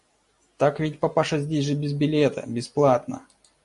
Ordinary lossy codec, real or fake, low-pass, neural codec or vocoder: MP3, 48 kbps; real; 14.4 kHz; none